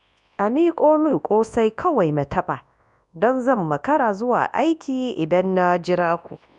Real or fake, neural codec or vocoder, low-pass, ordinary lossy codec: fake; codec, 24 kHz, 0.9 kbps, WavTokenizer, large speech release; 10.8 kHz; none